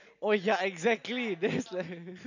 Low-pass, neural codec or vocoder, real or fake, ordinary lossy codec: 7.2 kHz; none; real; none